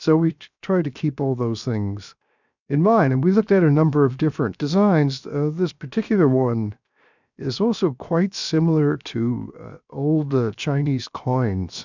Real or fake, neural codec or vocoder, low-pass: fake; codec, 16 kHz, 0.7 kbps, FocalCodec; 7.2 kHz